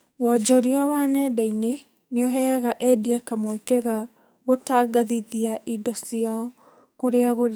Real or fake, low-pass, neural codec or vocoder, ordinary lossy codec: fake; none; codec, 44.1 kHz, 2.6 kbps, SNAC; none